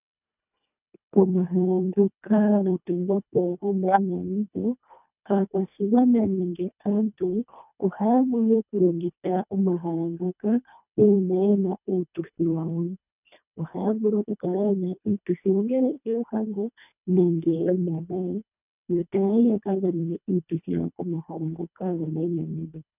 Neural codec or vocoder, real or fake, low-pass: codec, 24 kHz, 1.5 kbps, HILCodec; fake; 3.6 kHz